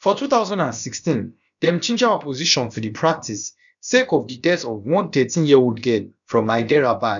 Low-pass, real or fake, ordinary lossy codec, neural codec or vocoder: 7.2 kHz; fake; none; codec, 16 kHz, about 1 kbps, DyCAST, with the encoder's durations